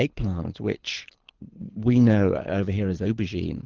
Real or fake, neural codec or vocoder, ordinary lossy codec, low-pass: fake; codec, 24 kHz, 6 kbps, HILCodec; Opus, 16 kbps; 7.2 kHz